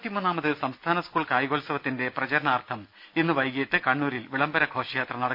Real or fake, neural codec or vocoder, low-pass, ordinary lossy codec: real; none; 5.4 kHz; none